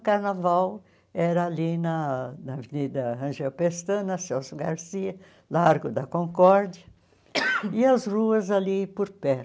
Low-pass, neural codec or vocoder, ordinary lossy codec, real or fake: none; none; none; real